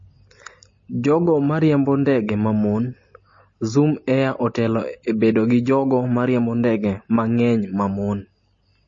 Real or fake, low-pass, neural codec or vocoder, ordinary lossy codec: real; 7.2 kHz; none; MP3, 32 kbps